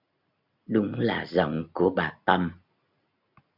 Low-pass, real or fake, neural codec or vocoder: 5.4 kHz; real; none